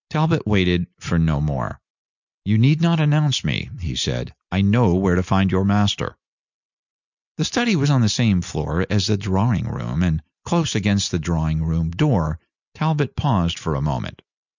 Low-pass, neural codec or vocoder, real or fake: 7.2 kHz; none; real